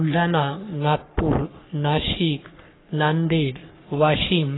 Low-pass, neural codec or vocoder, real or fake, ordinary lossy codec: 7.2 kHz; codec, 44.1 kHz, 3.4 kbps, Pupu-Codec; fake; AAC, 16 kbps